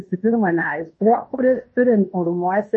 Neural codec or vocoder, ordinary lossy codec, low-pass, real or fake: codec, 16 kHz in and 24 kHz out, 0.9 kbps, LongCat-Audio-Codec, fine tuned four codebook decoder; MP3, 32 kbps; 10.8 kHz; fake